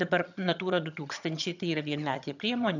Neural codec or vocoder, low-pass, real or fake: vocoder, 22.05 kHz, 80 mel bands, HiFi-GAN; 7.2 kHz; fake